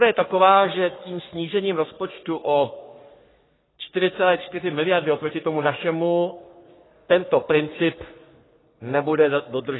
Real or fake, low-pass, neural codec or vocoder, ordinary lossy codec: fake; 7.2 kHz; codec, 44.1 kHz, 3.4 kbps, Pupu-Codec; AAC, 16 kbps